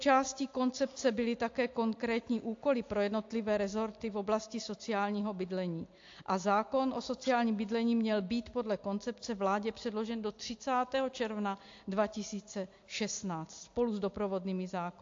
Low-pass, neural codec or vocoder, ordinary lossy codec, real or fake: 7.2 kHz; none; AAC, 48 kbps; real